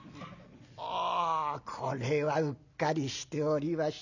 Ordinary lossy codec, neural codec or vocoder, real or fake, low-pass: none; none; real; 7.2 kHz